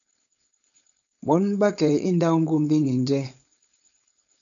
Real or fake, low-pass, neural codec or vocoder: fake; 7.2 kHz; codec, 16 kHz, 4.8 kbps, FACodec